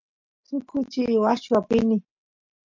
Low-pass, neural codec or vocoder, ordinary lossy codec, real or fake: 7.2 kHz; none; MP3, 48 kbps; real